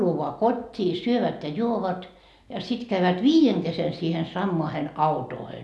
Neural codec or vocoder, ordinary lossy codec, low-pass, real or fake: none; none; none; real